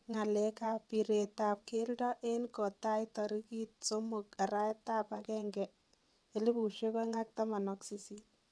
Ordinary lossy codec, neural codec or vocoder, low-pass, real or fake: none; vocoder, 22.05 kHz, 80 mel bands, WaveNeXt; none; fake